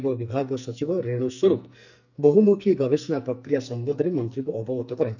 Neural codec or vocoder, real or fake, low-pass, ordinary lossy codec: codec, 44.1 kHz, 2.6 kbps, SNAC; fake; 7.2 kHz; none